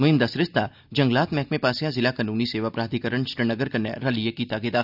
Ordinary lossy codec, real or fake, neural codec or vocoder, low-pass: none; real; none; 5.4 kHz